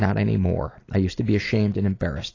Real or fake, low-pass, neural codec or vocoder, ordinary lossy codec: real; 7.2 kHz; none; AAC, 32 kbps